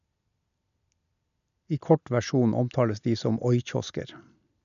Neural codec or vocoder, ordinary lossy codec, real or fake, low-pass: none; none; real; 7.2 kHz